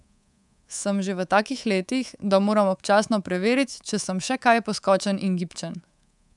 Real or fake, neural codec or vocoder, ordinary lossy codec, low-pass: fake; codec, 24 kHz, 3.1 kbps, DualCodec; none; 10.8 kHz